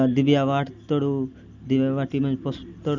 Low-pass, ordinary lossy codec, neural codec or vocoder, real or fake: 7.2 kHz; none; none; real